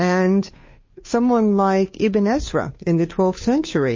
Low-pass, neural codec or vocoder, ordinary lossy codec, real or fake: 7.2 kHz; codec, 16 kHz, 2 kbps, FunCodec, trained on Chinese and English, 25 frames a second; MP3, 32 kbps; fake